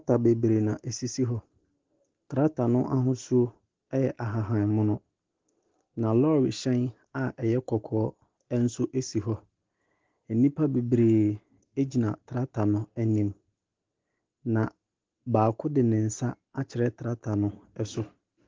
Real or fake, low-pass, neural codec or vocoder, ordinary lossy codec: real; 7.2 kHz; none; Opus, 16 kbps